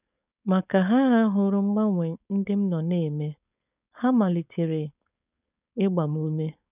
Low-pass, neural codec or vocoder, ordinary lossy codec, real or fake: 3.6 kHz; codec, 16 kHz, 4.8 kbps, FACodec; none; fake